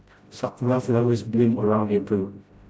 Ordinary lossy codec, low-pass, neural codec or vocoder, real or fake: none; none; codec, 16 kHz, 0.5 kbps, FreqCodec, smaller model; fake